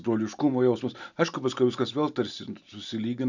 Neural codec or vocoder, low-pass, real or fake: none; 7.2 kHz; real